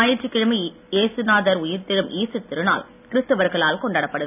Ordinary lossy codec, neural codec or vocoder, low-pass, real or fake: none; none; 3.6 kHz; real